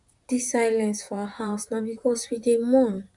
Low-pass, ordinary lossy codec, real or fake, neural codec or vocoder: 10.8 kHz; none; fake; vocoder, 44.1 kHz, 128 mel bands, Pupu-Vocoder